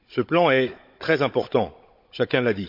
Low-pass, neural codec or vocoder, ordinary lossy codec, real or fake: 5.4 kHz; codec, 16 kHz, 16 kbps, FunCodec, trained on Chinese and English, 50 frames a second; MP3, 48 kbps; fake